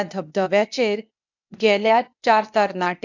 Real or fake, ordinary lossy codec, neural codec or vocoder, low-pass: fake; none; codec, 16 kHz, 0.8 kbps, ZipCodec; 7.2 kHz